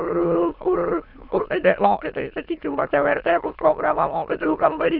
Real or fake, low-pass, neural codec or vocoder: fake; 5.4 kHz; autoencoder, 22.05 kHz, a latent of 192 numbers a frame, VITS, trained on many speakers